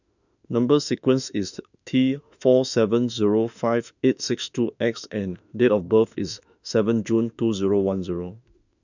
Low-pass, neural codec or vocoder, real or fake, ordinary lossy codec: 7.2 kHz; autoencoder, 48 kHz, 32 numbers a frame, DAC-VAE, trained on Japanese speech; fake; none